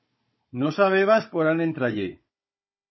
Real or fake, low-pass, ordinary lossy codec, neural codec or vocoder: fake; 7.2 kHz; MP3, 24 kbps; codec, 16 kHz, 16 kbps, FunCodec, trained on Chinese and English, 50 frames a second